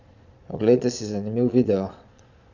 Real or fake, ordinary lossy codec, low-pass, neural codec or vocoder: fake; none; 7.2 kHz; vocoder, 22.05 kHz, 80 mel bands, Vocos